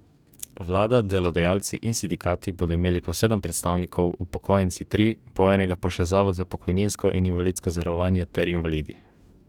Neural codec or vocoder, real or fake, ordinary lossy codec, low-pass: codec, 44.1 kHz, 2.6 kbps, DAC; fake; none; 19.8 kHz